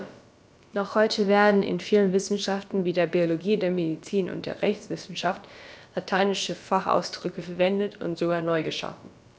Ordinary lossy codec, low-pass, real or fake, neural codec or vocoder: none; none; fake; codec, 16 kHz, about 1 kbps, DyCAST, with the encoder's durations